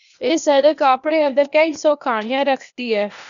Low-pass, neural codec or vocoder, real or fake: 7.2 kHz; codec, 16 kHz, 0.8 kbps, ZipCodec; fake